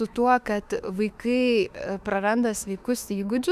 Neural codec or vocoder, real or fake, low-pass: autoencoder, 48 kHz, 32 numbers a frame, DAC-VAE, trained on Japanese speech; fake; 14.4 kHz